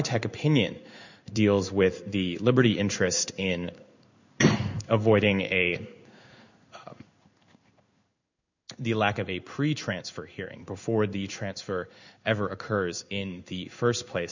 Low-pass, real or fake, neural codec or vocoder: 7.2 kHz; fake; codec, 16 kHz in and 24 kHz out, 1 kbps, XY-Tokenizer